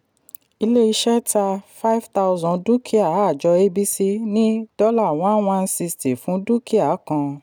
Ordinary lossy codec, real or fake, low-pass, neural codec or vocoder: none; real; none; none